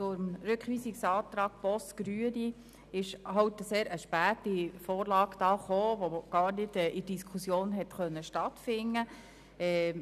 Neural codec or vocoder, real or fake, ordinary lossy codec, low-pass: none; real; none; 14.4 kHz